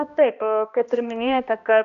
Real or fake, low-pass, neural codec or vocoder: fake; 7.2 kHz; codec, 16 kHz, 1 kbps, X-Codec, HuBERT features, trained on balanced general audio